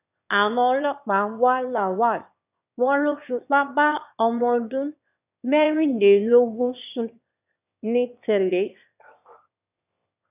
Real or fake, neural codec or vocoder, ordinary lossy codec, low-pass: fake; autoencoder, 22.05 kHz, a latent of 192 numbers a frame, VITS, trained on one speaker; none; 3.6 kHz